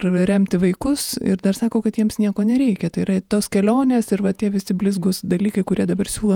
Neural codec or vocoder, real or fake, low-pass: vocoder, 48 kHz, 128 mel bands, Vocos; fake; 19.8 kHz